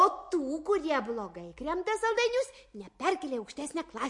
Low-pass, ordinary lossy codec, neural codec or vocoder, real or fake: 10.8 kHz; MP3, 48 kbps; none; real